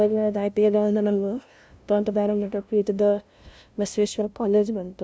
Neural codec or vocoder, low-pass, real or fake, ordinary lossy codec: codec, 16 kHz, 0.5 kbps, FunCodec, trained on LibriTTS, 25 frames a second; none; fake; none